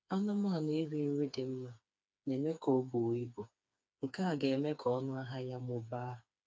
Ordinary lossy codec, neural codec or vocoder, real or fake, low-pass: none; codec, 16 kHz, 4 kbps, FreqCodec, smaller model; fake; none